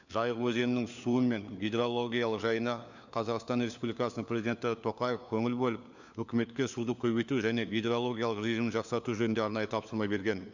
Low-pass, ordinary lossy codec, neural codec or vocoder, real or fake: 7.2 kHz; none; codec, 16 kHz, 4 kbps, FunCodec, trained on LibriTTS, 50 frames a second; fake